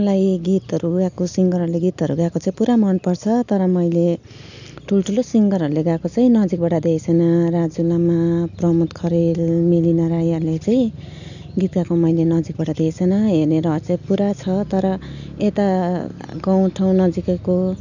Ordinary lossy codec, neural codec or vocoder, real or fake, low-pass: none; none; real; 7.2 kHz